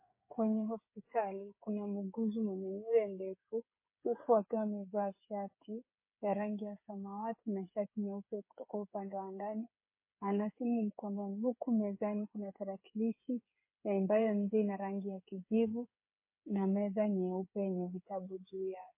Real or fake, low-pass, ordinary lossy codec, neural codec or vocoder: fake; 3.6 kHz; MP3, 24 kbps; codec, 16 kHz, 8 kbps, FreqCodec, smaller model